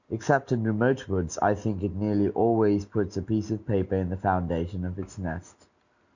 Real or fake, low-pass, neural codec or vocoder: real; 7.2 kHz; none